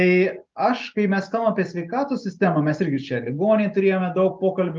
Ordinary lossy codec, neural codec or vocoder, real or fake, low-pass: Opus, 32 kbps; none; real; 7.2 kHz